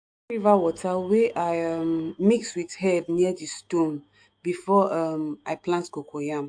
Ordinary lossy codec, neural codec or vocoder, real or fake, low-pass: AAC, 64 kbps; autoencoder, 48 kHz, 128 numbers a frame, DAC-VAE, trained on Japanese speech; fake; 9.9 kHz